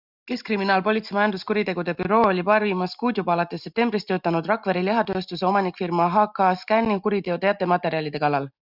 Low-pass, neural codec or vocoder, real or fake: 5.4 kHz; none; real